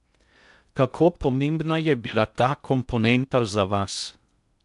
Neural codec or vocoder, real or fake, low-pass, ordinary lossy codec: codec, 16 kHz in and 24 kHz out, 0.6 kbps, FocalCodec, streaming, 2048 codes; fake; 10.8 kHz; MP3, 96 kbps